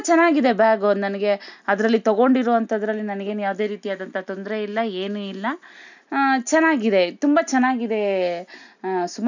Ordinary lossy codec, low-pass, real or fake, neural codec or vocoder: none; 7.2 kHz; real; none